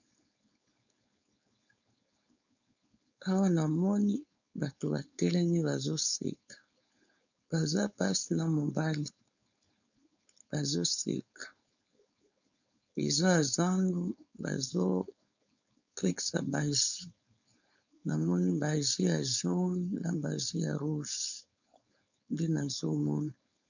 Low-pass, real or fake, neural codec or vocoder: 7.2 kHz; fake; codec, 16 kHz, 4.8 kbps, FACodec